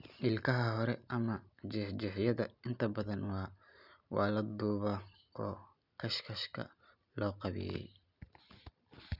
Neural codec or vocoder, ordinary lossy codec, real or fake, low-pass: none; none; real; 5.4 kHz